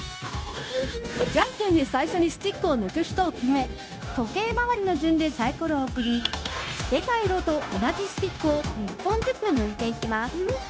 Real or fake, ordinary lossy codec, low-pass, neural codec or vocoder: fake; none; none; codec, 16 kHz, 0.9 kbps, LongCat-Audio-Codec